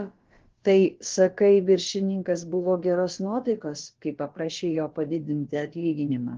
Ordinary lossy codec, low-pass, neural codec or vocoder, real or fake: Opus, 16 kbps; 7.2 kHz; codec, 16 kHz, about 1 kbps, DyCAST, with the encoder's durations; fake